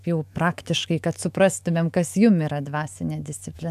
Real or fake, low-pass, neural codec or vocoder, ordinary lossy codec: fake; 14.4 kHz; autoencoder, 48 kHz, 128 numbers a frame, DAC-VAE, trained on Japanese speech; AAC, 96 kbps